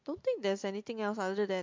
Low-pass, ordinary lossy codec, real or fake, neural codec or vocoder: 7.2 kHz; MP3, 48 kbps; real; none